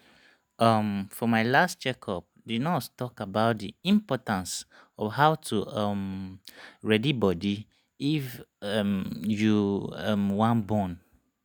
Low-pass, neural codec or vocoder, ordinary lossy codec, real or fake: none; none; none; real